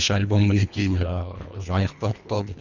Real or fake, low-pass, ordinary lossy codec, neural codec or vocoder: fake; 7.2 kHz; none; codec, 24 kHz, 1.5 kbps, HILCodec